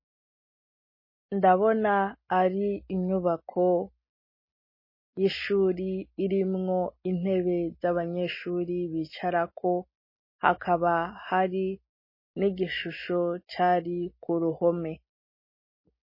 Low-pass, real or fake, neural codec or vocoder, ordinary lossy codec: 5.4 kHz; real; none; MP3, 24 kbps